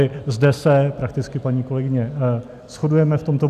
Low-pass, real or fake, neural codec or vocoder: 14.4 kHz; real; none